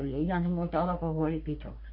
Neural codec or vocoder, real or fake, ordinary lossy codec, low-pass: codec, 44.1 kHz, 3.4 kbps, Pupu-Codec; fake; MP3, 32 kbps; 5.4 kHz